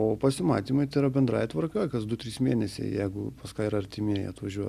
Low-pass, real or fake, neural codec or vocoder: 14.4 kHz; real; none